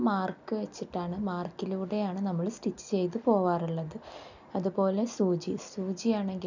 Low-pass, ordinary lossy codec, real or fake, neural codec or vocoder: 7.2 kHz; none; real; none